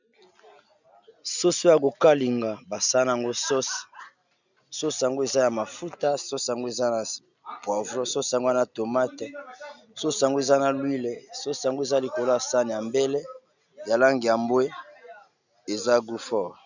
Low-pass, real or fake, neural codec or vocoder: 7.2 kHz; real; none